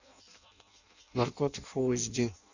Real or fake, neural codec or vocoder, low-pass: fake; codec, 16 kHz in and 24 kHz out, 0.6 kbps, FireRedTTS-2 codec; 7.2 kHz